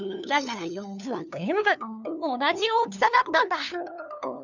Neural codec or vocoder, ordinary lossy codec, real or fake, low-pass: codec, 16 kHz, 2 kbps, FunCodec, trained on LibriTTS, 25 frames a second; none; fake; 7.2 kHz